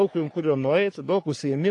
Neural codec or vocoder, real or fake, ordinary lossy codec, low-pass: codec, 44.1 kHz, 3.4 kbps, Pupu-Codec; fake; AAC, 48 kbps; 10.8 kHz